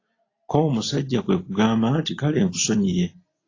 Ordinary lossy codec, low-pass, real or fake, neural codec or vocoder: AAC, 32 kbps; 7.2 kHz; real; none